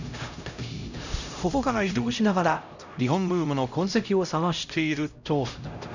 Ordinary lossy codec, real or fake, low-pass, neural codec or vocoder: none; fake; 7.2 kHz; codec, 16 kHz, 0.5 kbps, X-Codec, HuBERT features, trained on LibriSpeech